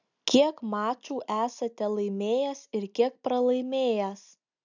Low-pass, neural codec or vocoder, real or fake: 7.2 kHz; none; real